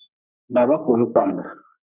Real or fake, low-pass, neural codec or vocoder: fake; 3.6 kHz; codec, 44.1 kHz, 3.4 kbps, Pupu-Codec